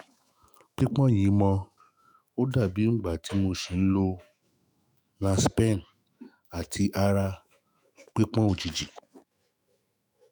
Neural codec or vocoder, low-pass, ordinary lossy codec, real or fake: autoencoder, 48 kHz, 128 numbers a frame, DAC-VAE, trained on Japanese speech; none; none; fake